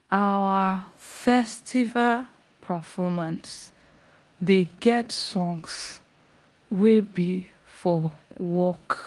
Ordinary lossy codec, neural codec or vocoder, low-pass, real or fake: Opus, 32 kbps; codec, 16 kHz in and 24 kHz out, 0.9 kbps, LongCat-Audio-Codec, four codebook decoder; 10.8 kHz; fake